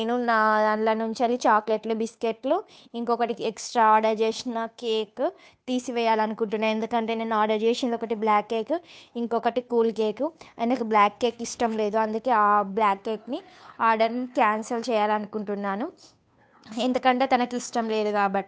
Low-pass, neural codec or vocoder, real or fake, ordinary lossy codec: none; codec, 16 kHz, 2 kbps, FunCodec, trained on Chinese and English, 25 frames a second; fake; none